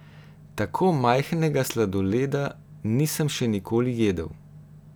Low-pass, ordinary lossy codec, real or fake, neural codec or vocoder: none; none; real; none